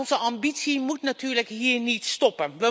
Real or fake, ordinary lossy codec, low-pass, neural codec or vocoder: real; none; none; none